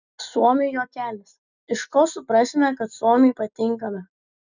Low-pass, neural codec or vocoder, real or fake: 7.2 kHz; none; real